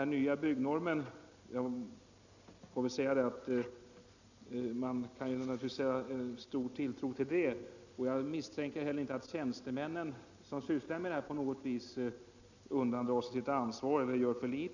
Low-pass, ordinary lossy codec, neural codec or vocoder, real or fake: 7.2 kHz; none; none; real